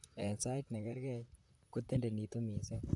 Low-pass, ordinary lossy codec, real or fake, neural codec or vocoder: 10.8 kHz; none; fake; vocoder, 44.1 kHz, 128 mel bands, Pupu-Vocoder